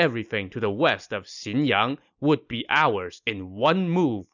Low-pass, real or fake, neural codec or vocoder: 7.2 kHz; real; none